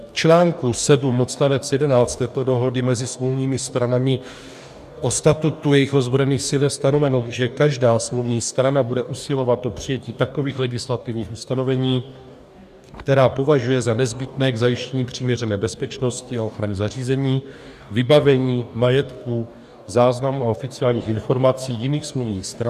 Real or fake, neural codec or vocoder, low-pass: fake; codec, 44.1 kHz, 2.6 kbps, DAC; 14.4 kHz